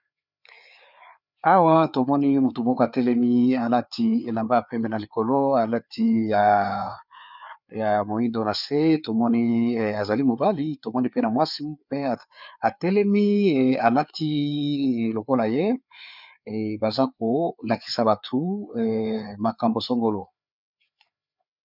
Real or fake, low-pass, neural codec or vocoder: fake; 5.4 kHz; codec, 16 kHz, 4 kbps, FreqCodec, larger model